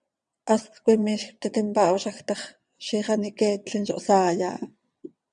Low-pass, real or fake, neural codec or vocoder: 9.9 kHz; fake; vocoder, 22.05 kHz, 80 mel bands, WaveNeXt